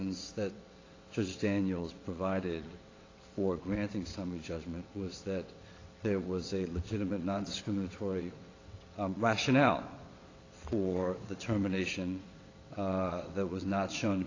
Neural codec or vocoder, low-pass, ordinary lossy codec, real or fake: vocoder, 22.05 kHz, 80 mel bands, WaveNeXt; 7.2 kHz; AAC, 32 kbps; fake